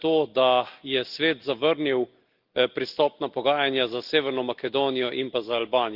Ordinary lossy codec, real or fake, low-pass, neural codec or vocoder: Opus, 24 kbps; real; 5.4 kHz; none